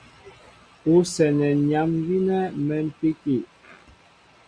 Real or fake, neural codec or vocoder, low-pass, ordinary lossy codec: real; none; 9.9 kHz; Opus, 64 kbps